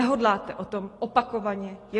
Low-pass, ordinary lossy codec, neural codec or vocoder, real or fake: 10.8 kHz; AAC, 32 kbps; none; real